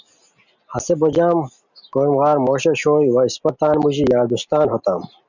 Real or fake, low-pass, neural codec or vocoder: real; 7.2 kHz; none